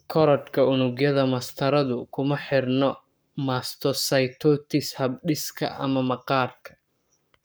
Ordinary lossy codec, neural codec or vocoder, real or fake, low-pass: none; codec, 44.1 kHz, 7.8 kbps, Pupu-Codec; fake; none